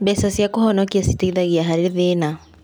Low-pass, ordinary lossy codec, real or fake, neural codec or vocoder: none; none; real; none